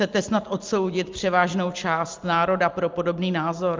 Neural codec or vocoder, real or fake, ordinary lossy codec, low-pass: none; real; Opus, 24 kbps; 7.2 kHz